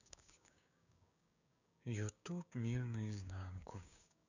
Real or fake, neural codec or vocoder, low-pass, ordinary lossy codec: fake; codec, 16 kHz, 6 kbps, DAC; 7.2 kHz; none